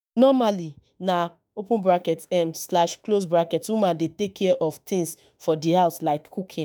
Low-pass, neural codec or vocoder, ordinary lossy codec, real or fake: none; autoencoder, 48 kHz, 32 numbers a frame, DAC-VAE, trained on Japanese speech; none; fake